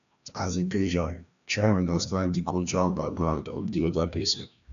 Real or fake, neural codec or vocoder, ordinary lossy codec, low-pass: fake; codec, 16 kHz, 1 kbps, FreqCodec, larger model; none; 7.2 kHz